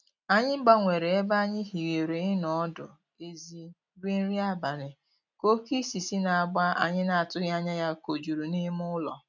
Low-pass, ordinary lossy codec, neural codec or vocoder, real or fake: 7.2 kHz; none; none; real